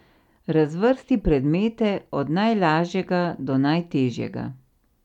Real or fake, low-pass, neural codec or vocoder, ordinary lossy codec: real; 19.8 kHz; none; none